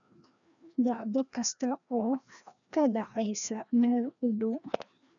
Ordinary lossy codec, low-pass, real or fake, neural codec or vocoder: none; 7.2 kHz; fake; codec, 16 kHz, 1 kbps, FreqCodec, larger model